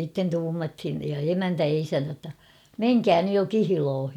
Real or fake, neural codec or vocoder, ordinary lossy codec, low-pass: real; none; none; 19.8 kHz